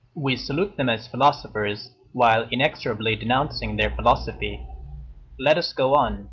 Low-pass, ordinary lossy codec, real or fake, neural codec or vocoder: 7.2 kHz; Opus, 32 kbps; real; none